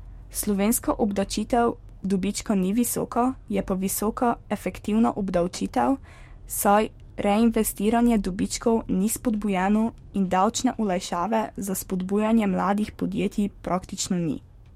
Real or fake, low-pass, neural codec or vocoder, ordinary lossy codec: fake; 19.8 kHz; codec, 44.1 kHz, 7.8 kbps, DAC; MP3, 64 kbps